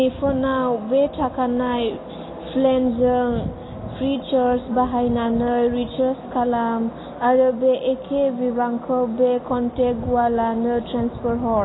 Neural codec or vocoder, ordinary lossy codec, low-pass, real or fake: none; AAC, 16 kbps; 7.2 kHz; real